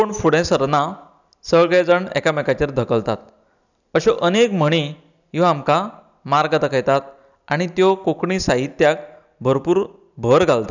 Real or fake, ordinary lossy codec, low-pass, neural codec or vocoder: real; none; 7.2 kHz; none